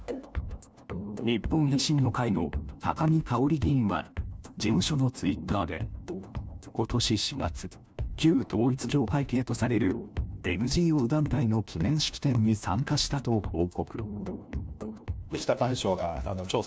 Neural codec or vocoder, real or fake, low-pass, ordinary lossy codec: codec, 16 kHz, 1 kbps, FunCodec, trained on LibriTTS, 50 frames a second; fake; none; none